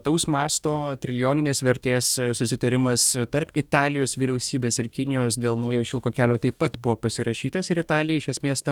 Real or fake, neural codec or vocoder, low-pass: fake; codec, 44.1 kHz, 2.6 kbps, DAC; 19.8 kHz